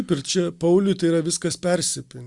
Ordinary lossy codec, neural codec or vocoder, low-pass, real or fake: Opus, 64 kbps; none; 10.8 kHz; real